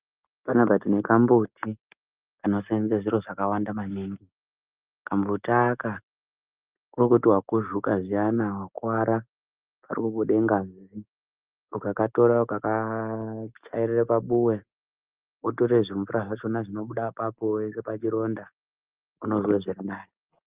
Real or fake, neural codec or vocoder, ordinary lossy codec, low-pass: real; none; Opus, 32 kbps; 3.6 kHz